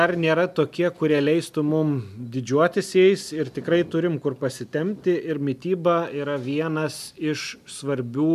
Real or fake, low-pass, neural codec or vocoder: real; 14.4 kHz; none